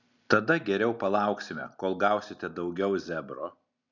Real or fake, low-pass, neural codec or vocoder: real; 7.2 kHz; none